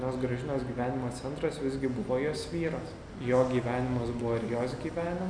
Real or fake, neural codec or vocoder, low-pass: real; none; 9.9 kHz